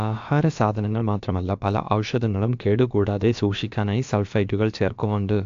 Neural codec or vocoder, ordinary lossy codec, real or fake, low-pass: codec, 16 kHz, about 1 kbps, DyCAST, with the encoder's durations; AAC, 64 kbps; fake; 7.2 kHz